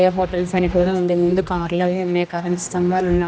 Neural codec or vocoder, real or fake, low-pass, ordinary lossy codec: codec, 16 kHz, 1 kbps, X-Codec, HuBERT features, trained on general audio; fake; none; none